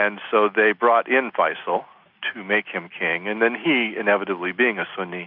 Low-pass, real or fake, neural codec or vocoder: 5.4 kHz; real; none